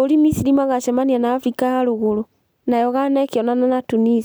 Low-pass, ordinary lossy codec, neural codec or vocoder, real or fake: none; none; none; real